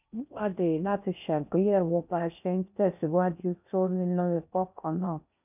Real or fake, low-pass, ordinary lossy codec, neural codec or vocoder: fake; 3.6 kHz; none; codec, 16 kHz in and 24 kHz out, 0.6 kbps, FocalCodec, streaming, 4096 codes